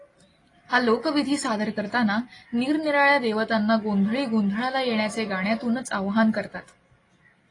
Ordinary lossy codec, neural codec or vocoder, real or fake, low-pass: AAC, 32 kbps; none; real; 10.8 kHz